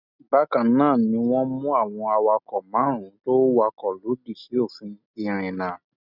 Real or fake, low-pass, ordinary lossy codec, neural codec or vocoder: real; 5.4 kHz; none; none